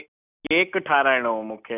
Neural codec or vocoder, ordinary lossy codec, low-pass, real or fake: none; none; 3.6 kHz; real